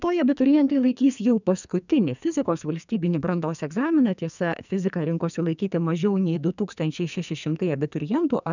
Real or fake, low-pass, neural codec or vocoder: fake; 7.2 kHz; codec, 44.1 kHz, 2.6 kbps, SNAC